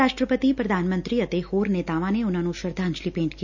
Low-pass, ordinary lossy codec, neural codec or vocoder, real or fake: 7.2 kHz; none; none; real